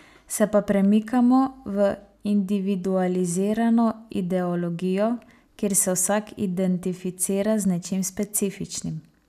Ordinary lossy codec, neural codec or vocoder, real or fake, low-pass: none; none; real; 14.4 kHz